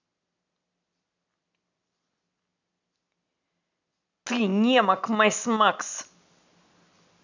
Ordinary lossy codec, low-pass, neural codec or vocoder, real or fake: none; 7.2 kHz; none; real